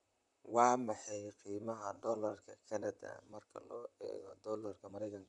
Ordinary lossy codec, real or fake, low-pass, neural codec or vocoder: none; fake; 10.8 kHz; vocoder, 44.1 kHz, 128 mel bands, Pupu-Vocoder